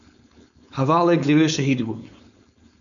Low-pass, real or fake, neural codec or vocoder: 7.2 kHz; fake; codec, 16 kHz, 4.8 kbps, FACodec